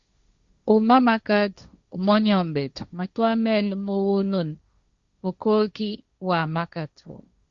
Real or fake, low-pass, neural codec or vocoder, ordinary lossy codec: fake; 7.2 kHz; codec, 16 kHz, 1.1 kbps, Voila-Tokenizer; Opus, 64 kbps